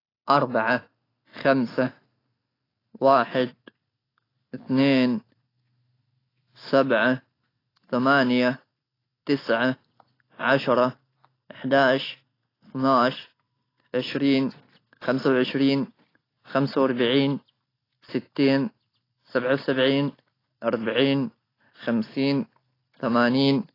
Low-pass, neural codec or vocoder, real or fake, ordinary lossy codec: 5.4 kHz; none; real; AAC, 24 kbps